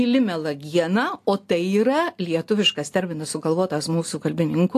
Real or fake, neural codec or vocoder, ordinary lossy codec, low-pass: real; none; AAC, 64 kbps; 14.4 kHz